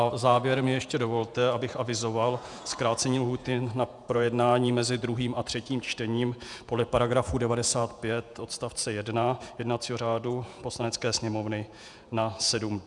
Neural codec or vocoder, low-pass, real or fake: vocoder, 48 kHz, 128 mel bands, Vocos; 10.8 kHz; fake